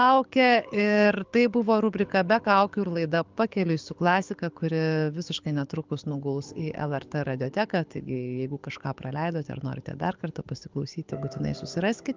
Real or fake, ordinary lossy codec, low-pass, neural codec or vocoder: fake; Opus, 24 kbps; 7.2 kHz; codec, 24 kHz, 6 kbps, HILCodec